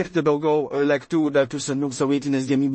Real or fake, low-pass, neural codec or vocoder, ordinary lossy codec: fake; 9.9 kHz; codec, 16 kHz in and 24 kHz out, 0.4 kbps, LongCat-Audio-Codec, two codebook decoder; MP3, 32 kbps